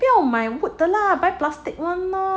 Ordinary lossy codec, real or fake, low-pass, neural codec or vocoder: none; real; none; none